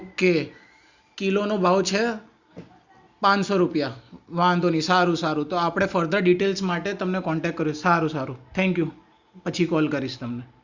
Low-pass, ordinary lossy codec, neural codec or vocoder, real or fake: 7.2 kHz; Opus, 64 kbps; none; real